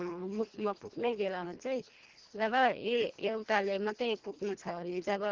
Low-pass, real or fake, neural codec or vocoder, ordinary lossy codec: 7.2 kHz; fake; codec, 24 kHz, 1.5 kbps, HILCodec; Opus, 16 kbps